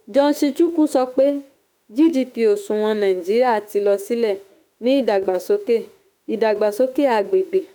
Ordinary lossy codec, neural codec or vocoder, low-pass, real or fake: none; autoencoder, 48 kHz, 32 numbers a frame, DAC-VAE, trained on Japanese speech; none; fake